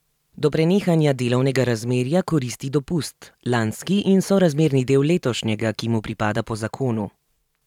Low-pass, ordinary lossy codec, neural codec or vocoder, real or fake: 19.8 kHz; none; vocoder, 44.1 kHz, 128 mel bands every 512 samples, BigVGAN v2; fake